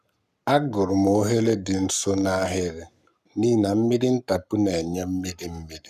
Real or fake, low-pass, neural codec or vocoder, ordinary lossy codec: fake; 14.4 kHz; codec, 44.1 kHz, 7.8 kbps, Pupu-Codec; none